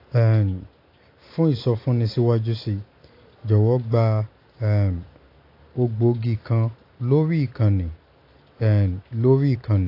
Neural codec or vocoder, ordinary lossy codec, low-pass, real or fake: none; AAC, 32 kbps; 5.4 kHz; real